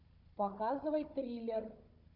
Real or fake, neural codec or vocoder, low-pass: fake; codec, 16 kHz, 16 kbps, FunCodec, trained on Chinese and English, 50 frames a second; 5.4 kHz